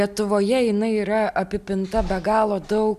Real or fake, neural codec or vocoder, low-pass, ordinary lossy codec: real; none; 14.4 kHz; MP3, 96 kbps